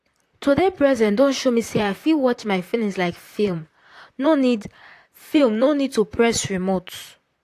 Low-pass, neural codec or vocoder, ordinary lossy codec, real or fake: 14.4 kHz; vocoder, 44.1 kHz, 128 mel bands, Pupu-Vocoder; AAC, 64 kbps; fake